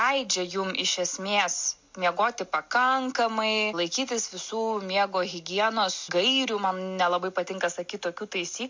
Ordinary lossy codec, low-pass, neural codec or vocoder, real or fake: MP3, 48 kbps; 7.2 kHz; none; real